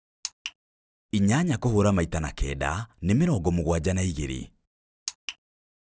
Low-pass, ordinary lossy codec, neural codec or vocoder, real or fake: none; none; none; real